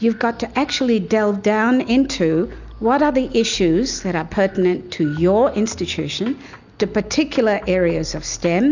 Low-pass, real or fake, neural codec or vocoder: 7.2 kHz; real; none